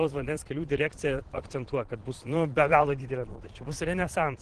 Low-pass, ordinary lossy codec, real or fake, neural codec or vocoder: 9.9 kHz; Opus, 16 kbps; fake; vocoder, 22.05 kHz, 80 mel bands, Vocos